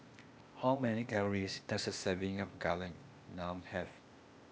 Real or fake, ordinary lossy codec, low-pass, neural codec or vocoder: fake; none; none; codec, 16 kHz, 0.8 kbps, ZipCodec